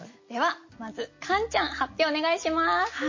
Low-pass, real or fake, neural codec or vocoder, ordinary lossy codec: 7.2 kHz; real; none; MP3, 32 kbps